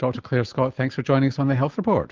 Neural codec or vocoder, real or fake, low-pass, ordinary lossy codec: none; real; 7.2 kHz; Opus, 32 kbps